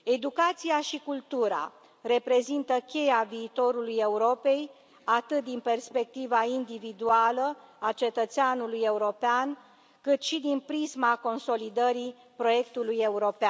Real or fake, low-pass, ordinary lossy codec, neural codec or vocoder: real; none; none; none